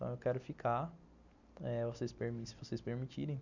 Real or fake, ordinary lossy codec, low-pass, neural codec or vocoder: real; none; 7.2 kHz; none